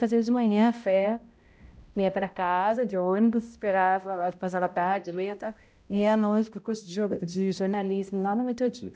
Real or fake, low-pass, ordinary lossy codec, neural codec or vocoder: fake; none; none; codec, 16 kHz, 0.5 kbps, X-Codec, HuBERT features, trained on balanced general audio